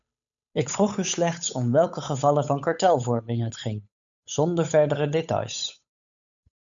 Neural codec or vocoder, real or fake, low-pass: codec, 16 kHz, 8 kbps, FunCodec, trained on Chinese and English, 25 frames a second; fake; 7.2 kHz